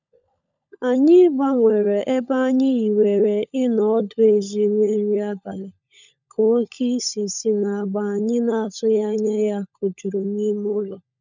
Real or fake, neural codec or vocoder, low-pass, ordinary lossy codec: fake; codec, 16 kHz, 16 kbps, FunCodec, trained on LibriTTS, 50 frames a second; 7.2 kHz; none